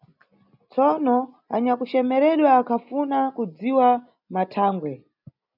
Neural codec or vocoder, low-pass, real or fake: none; 5.4 kHz; real